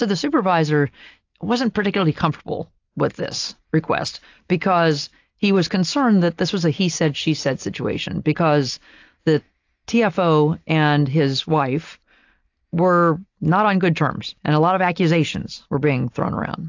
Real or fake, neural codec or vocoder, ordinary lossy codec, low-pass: real; none; AAC, 48 kbps; 7.2 kHz